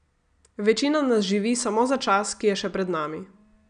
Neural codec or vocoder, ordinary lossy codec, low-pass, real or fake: none; none; 9.9 kHz; real